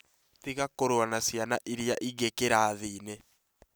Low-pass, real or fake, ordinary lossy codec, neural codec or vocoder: none; real; none; none